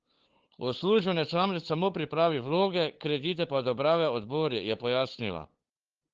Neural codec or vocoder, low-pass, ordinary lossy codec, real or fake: codec, 16 kHz, 8 kbps, FunCodec, trained on LibriTTS, 25 frames a second; 7.2 kHz; Opus, 16 kbps; fake